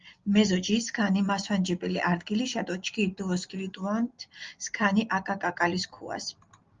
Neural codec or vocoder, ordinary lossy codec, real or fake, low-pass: none; Opus, 32 kbps; real; 7.2 kHz